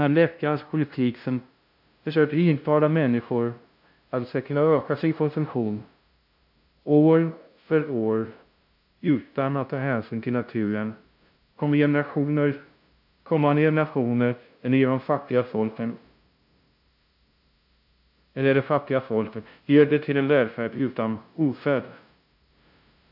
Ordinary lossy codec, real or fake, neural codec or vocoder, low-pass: AAC, 48 kbps; fake; codec, 16 kHz, 0.5 kbps, FunCodec, trained on LibriTTS, 25 frames a second; 5.4 kHz